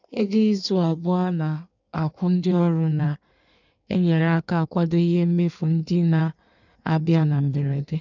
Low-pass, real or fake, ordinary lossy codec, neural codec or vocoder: 7.2 kHz; fake; none; codec, 16 kHz in and 24 kHz out, 1.1 kbps, FireRedTTS-2 codec